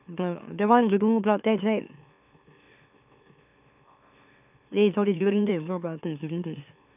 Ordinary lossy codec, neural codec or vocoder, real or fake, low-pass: none; autoencoder, 44.1 kHz, a latent of 192 numbers a frame, MeloTTS; fake; 3.6 kHz